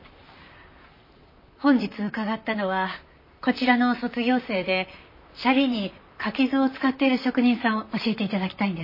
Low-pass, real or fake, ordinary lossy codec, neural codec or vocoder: 5.4 kHz; fake; MP3, 24 kbps; vocoder, 44.1 kHz, 128 mel bands, Pupu-Vocoder